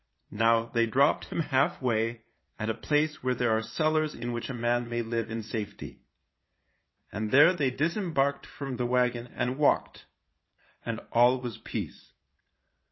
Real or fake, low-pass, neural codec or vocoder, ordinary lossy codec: fake; 7.2 kHz; vocoder, 22.05 kHz, 80 mel bands, Vocos; MP3, 24 kbps